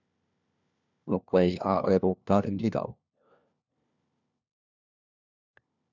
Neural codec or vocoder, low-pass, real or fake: codec, 16 kHz, 1 kbps, FunCodec, trained on LibriTTS, 50 frames a second; 7.2 kHz; fake